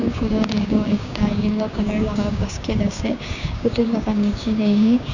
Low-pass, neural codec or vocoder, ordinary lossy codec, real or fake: 7.2 kHz; codec, 16 kHz, 6 kbps, DAC; none; fake